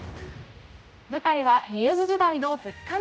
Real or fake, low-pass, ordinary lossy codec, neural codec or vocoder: fake; none; none; codec, 16 kHz, 0.5 kbps, X-Codec, HuBERT features, trained on general audio